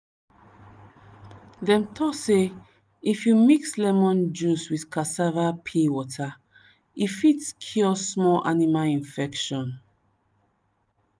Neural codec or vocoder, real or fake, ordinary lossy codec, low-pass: none; real; none; none